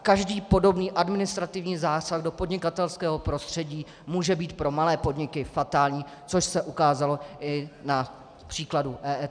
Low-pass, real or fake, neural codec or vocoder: 9.9 kHz; real; none